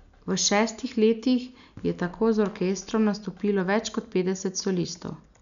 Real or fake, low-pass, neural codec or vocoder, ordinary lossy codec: real; 7.2 kHz; none; none